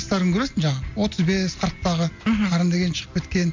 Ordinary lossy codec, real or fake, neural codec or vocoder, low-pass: MP3, 48 kbps; real; none; 7.2 kHz